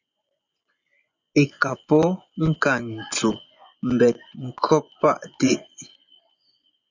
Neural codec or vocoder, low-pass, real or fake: vocoder, 24 kHz, 100 mel bands, Vocos; 7.2 kHz; fake